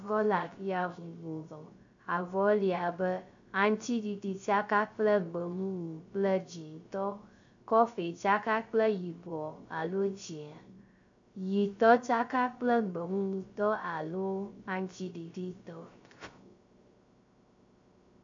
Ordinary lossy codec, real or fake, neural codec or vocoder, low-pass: MP3, 64 kbps; fake; codec, 16 kHz, 0.3 kbps, FocalCodec; 7.2 kHz